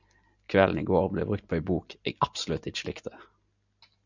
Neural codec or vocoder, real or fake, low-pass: none; real; 7.2 kHz